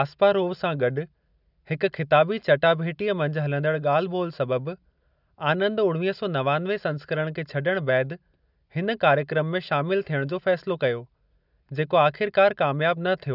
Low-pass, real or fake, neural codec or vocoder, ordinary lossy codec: 5.4 kHz; real; none; none